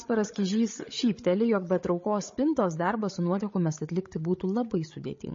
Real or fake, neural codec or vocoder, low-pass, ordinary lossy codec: fake; codec, 16 kHz, 16 kbps, FreqCodec, larger model; 7.2 kHz; MP3, 32 kbps